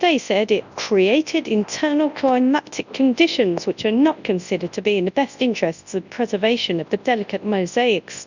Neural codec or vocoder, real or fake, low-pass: codec, 24 kHz, 0.9 kbps, WavTokenizer, large speech release; fake; 7.2 kHz